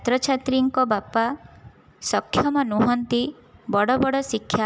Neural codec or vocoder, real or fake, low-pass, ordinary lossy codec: none; real; none; none